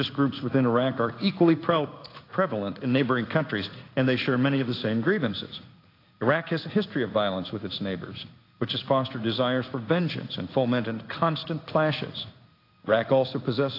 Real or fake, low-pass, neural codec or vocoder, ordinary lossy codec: fake; 5.4 kHz; codec, 16 kHz in and 24 kHz out, 1 kbps, XY-Tokenizer; AAC, 32 kbps